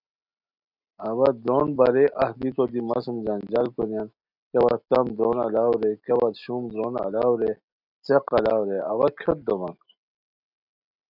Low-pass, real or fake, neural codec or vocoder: 5.4 kHz; real; none